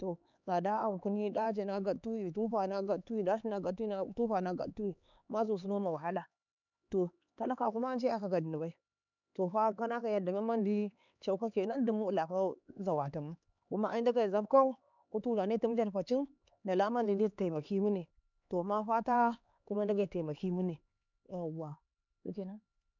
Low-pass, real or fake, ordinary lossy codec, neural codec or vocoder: 7.2 kHz; fake; none; codec, 16 kHz, 4 kbps, X-Codec, HuBERT features, trained on LibriSpeech